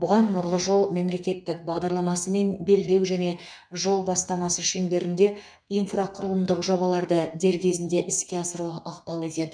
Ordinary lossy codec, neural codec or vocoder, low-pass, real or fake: none; codec, 32 kHz, 1.9 kbps, SNAC; 9.9 kHz; fake